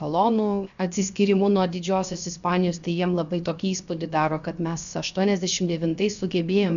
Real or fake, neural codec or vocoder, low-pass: fake; codec, 16 kHz, 0.7 kbps, FocalCodec; 7.2 kHz